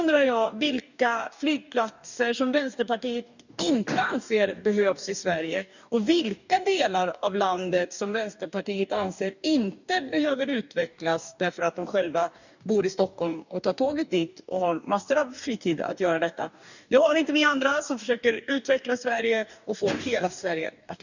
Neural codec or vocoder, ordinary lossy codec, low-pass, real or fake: codec, 44.1 kHz, 2.6 kbps, DAC; none; 7.2 kHz; fake